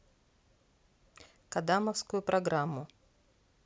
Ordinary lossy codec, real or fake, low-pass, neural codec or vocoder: none; real; none; none